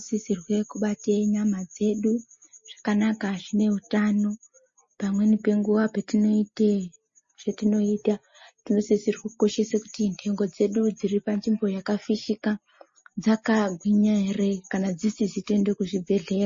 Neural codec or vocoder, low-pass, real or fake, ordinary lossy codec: none; 7.2 kHz; real; MP3, 32 kbps